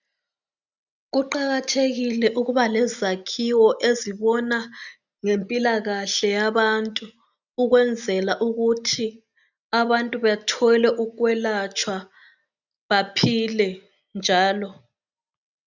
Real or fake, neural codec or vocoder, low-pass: real; none; 7.2 kHz